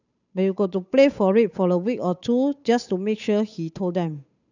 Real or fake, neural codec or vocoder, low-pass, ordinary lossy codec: fake; codec, 16 kHz, 8 kbps, FunCodec, trained on Chinese and English, 25 frames a second; 7.2 kHz; none